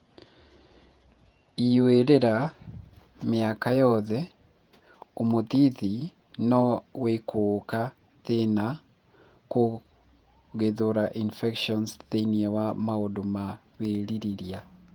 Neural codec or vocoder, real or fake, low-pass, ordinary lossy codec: vocoder, 48 kHz, 128 mel bands, Vocos; fake; 19.8 kHz; Opus, 32 kbps